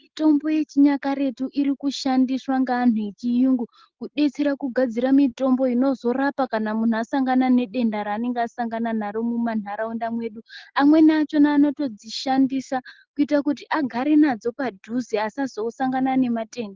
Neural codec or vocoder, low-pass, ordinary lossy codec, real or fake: none; 7.2 kHz; Opus, 16 kbps; real